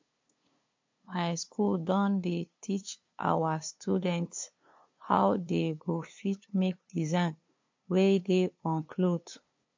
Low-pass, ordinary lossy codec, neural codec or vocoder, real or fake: 7.2 kHz; MP3, 48 kbps; codec, 16 kHz, 2 kbps, FunCodec, trained on LibriTTS, 25 frames a second; fake